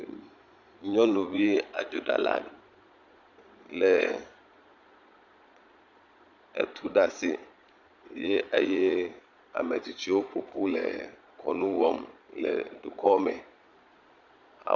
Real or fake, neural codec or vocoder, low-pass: fake; vocoder, 22.05 kHz, 80 mel bands, Vocos; 7.2 kHz